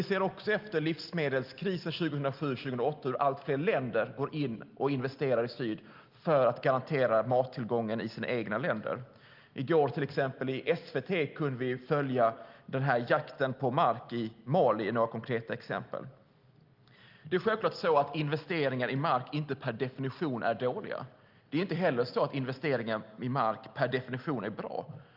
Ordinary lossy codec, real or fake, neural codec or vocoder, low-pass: Opus, 32 kbps; real; none; 5.4 kHz